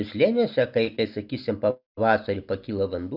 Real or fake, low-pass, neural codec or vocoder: real; 5.4 kHz; none